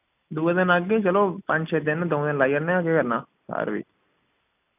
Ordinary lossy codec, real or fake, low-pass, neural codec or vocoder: none; real; 3.6 kHz; none